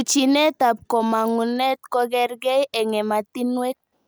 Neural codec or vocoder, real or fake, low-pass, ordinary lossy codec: none; real; none; none